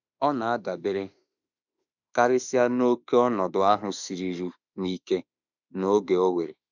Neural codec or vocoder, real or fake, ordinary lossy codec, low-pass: autoencoder, 48 kHz, 32 numbers a frame, DAC-VAE, trained on Japanese speech; fake; none; 7.2 kHz